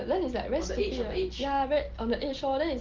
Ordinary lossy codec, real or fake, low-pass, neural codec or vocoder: Opus, 16 kbps; real; 7.2 kHz; none